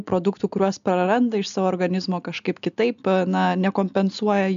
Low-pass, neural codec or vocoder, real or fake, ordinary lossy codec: 7.2 kHz; none; real; MP3, 96 kbps